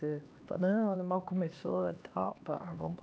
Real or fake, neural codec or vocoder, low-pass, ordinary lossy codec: fake; codec, 16 kHz, 2 kbps, X-Codec, HuBERT features, trained on LibriSpeech; none; none